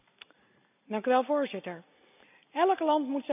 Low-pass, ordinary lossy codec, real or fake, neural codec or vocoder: 3.6 kHz; none; real; none